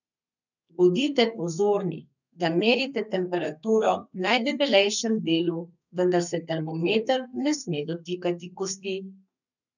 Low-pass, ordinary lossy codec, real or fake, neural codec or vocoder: 7.2 kHz; none; fake; codec, 32 kHz, 1.9 kbps, SNAC